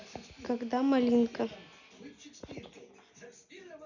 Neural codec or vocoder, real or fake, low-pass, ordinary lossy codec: none; real; 7.2 kHz; none